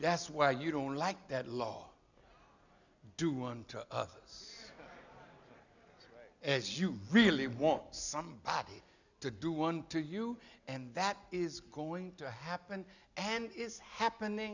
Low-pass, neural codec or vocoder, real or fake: 7.2 kHz; none; real